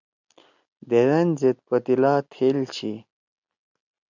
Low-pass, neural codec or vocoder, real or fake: 7.2 kHz; none; real